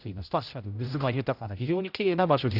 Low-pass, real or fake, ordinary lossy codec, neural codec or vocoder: 5.4 kHz; fake; none; codec, 16 kHz, 0.5 kbps, X-Codec, HuBERT features, trained on general audio